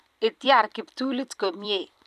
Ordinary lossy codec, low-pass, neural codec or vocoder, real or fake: none; 14.4 kHz; vocoder, 44.1 kHz, 128 mel bands every 256 samples, BigVGAN v2; fake